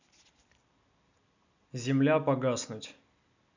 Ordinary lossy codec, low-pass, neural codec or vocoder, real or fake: none; 7.2 kHz; none; real